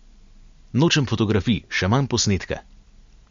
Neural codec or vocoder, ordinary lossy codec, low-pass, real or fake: none; MP3, 48 kbps; 7.2 kHz; real